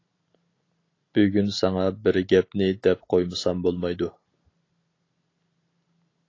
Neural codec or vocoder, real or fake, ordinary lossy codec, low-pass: none; real; AAC, 32 kbps; 7.2 kHz